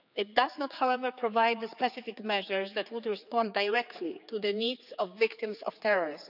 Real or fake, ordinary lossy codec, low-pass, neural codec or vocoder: fake; none; 5.4 kHz; codec, 16 kHz, 4 kbps, X-Codec, HuBERT features, trained on general audio